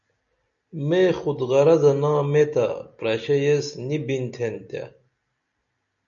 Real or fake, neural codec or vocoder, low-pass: real; none; 7.2 kHz